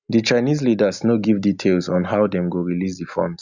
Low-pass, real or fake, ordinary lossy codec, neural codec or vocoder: 7.2 kHz; real; none; none